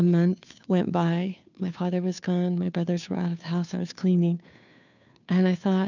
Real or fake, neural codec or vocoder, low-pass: fake; codec, 16 kHz, 2 kbps, FunCodec, trained on Chinese and English, 25 frames a second; 7.2 kHz